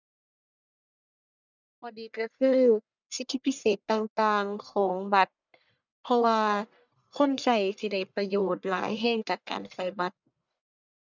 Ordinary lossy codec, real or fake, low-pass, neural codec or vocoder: none; fake; 7.2 kHz; codec, 44.1 kHz, 1.7 kbps, Pupu-Codec